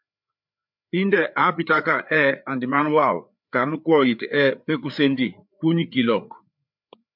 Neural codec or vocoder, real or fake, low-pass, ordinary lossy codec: codec, 16 kHz, 4 kbps, FreqCodec, larger model; fake; 5.4 kHz; MP3, 48 kbps